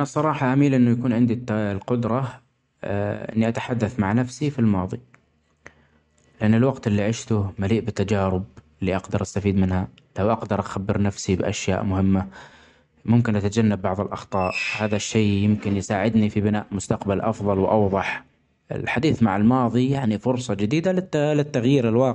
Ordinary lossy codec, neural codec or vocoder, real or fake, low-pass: MP3, 64 kbps; none; real; 10.8 kHz